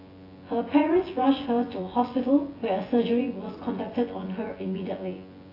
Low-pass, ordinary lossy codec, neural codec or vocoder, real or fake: 5.4 kHz; AAC, 24 kbps; vocoder, 24 kHz, 100 mel bands, Vocos; fake